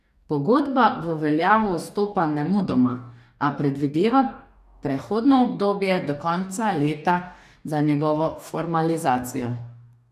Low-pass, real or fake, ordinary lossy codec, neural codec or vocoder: 14.4 kHz; fake; none; codec, 44.1 kHz, 2.6 kbps, DAC